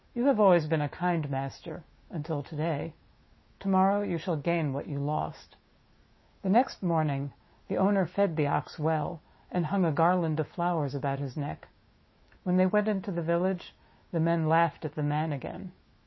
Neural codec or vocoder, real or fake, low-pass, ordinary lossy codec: autoencoder, 48 kHz, 128 numbers a frame, DAC-VAE, trained on Japanese speech; fake; 7.2 kHz; MP3, 24 kbps